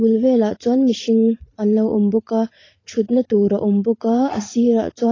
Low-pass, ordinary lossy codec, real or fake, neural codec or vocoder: 7.2 kHz; AAC, 32 kbps; fake; vocoder, 44.1 kHz, 80 mel bands, Vocos